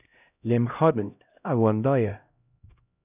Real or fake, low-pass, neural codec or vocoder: fake; 3.6 kHz; codec, 16 kHz, 0.5 kbps, X-Codec, HuBERT features, trained on LibriSpeech